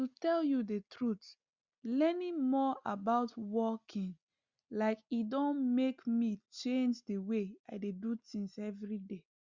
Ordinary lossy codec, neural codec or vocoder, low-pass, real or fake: Opus, 64 kbps; none; 7.2 kHz; real